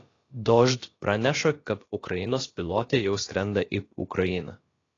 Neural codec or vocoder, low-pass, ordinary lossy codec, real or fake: codec, 16 kHz, about 1 kbps, DyCAST, with the encoder's durations; 7.2 kHz; AAC, 32 kbps; fake